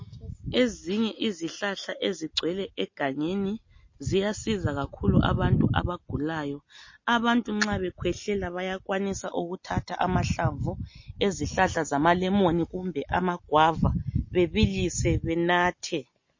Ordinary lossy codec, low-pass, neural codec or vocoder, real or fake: MP3, 32 kbps; 7.2 kHz; none; real